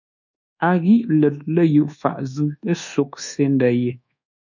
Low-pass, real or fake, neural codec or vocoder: 7.2 kHz; fake; codec, 24 kHz, 1.2 kbps, DualCodec